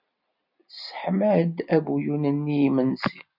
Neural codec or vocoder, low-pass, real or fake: none; 5.4 kHz; real